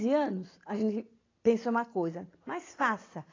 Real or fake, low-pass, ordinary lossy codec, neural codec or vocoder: real; 7.2 kHz; AAC, 32 kbps; none